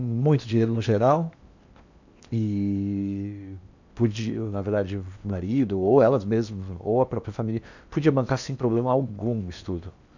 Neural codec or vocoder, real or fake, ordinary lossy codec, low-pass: codec, 16 kHz in and 24 kHz out, 0.8 kbps, FocalCodec, streaming, 65536 codes; fake; none; 7.2 kHz